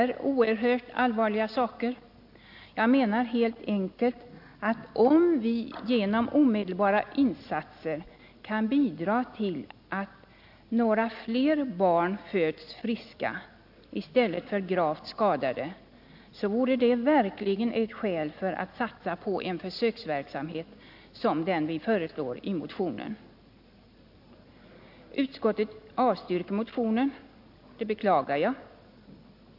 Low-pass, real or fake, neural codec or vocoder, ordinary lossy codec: 5.4 kHz; real; none; AAC, 48 kbps